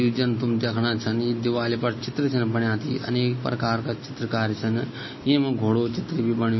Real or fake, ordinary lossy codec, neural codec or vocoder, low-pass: real; MP3, 24 kbps; none; 7.2 kHz